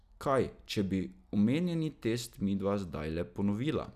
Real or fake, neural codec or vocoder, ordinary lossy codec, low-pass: real; none; none; 14.4 kHz